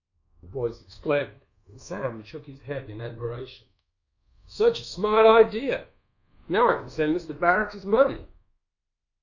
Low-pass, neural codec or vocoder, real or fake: 7.2 kHz; codec, 24 kHz, 1.2 kbps, DualCodec; fake